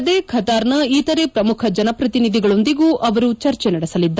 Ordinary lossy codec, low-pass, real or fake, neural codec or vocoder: none; none; real; none